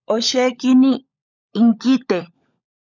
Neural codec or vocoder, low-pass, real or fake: codec, 16 kHz, 16 kbps, FunCodec, trained on LibriTTS, 50 frames a second; 7.2 kHz; fake